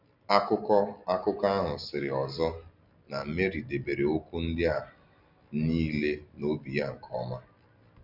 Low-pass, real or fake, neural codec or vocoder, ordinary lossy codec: 5.4 kHz; real; none; none